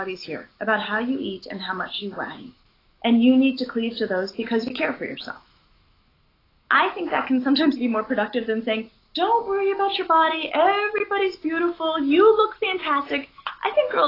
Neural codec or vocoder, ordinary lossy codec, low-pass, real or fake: autoencoder, 48 kHz, 128 numbers a frame, DAC-VAE, trained on Japanese speech; AAC, 24 kbps; 5.4 kHz; fake